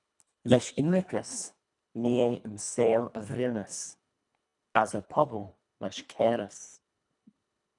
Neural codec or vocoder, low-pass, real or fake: codec, 24 kHz, 1.5 kbps, HILCodec; 10.8 kHz; fake